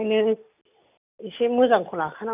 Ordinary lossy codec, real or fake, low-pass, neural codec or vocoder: none; real; 3.6 kHz; none